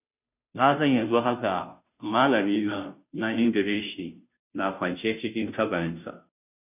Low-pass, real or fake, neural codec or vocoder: 3.6 kHz; fake; codec, 16 kHz, 0.5 kbps, FunCodec, trained on Chinese and English, 25 frames a second